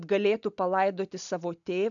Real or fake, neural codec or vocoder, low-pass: real; none; 7.2 kHz